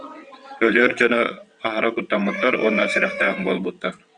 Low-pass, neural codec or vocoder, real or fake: 9.9 kHz; vocoder, 22.05 kHz, 80 mel bands, WaveNeXt; fake